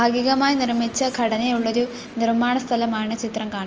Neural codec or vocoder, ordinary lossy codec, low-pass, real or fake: none; Opus, 24 kbps; 7.2 kHz; real